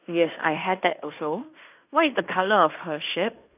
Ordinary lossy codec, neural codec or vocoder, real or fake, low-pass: none; codec, 16 kHz in and 24 kHz out, 0.9 kbps, LongCat-Audio-Codec, fine tuned four codebook decoder; fake; 3.6 kHz